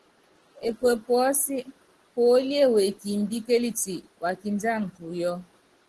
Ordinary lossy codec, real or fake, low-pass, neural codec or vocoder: Opus, 16 kbps; real; 10.8 kHz; none